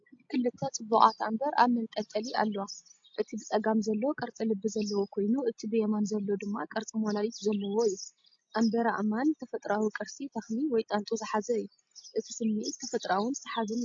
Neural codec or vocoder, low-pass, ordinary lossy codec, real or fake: none; 7.2 kHz; MP3, 48 kbps; real